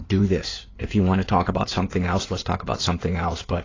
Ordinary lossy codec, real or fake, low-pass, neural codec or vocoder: AAC, 32 kbps; fake; 7.2 kHz; codec, 16 kHz in and 24 kHz out, 2.2 kbps, FireRedTTS-2 codec